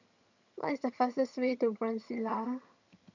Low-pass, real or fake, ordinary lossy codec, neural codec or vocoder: 7.2 kHz; fake; MP3, 64 kbps; vocoder, 22.05 kHz, 80 mel bands, HiFi-GAN